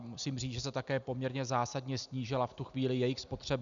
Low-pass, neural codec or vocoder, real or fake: 7.2 kHz; none; real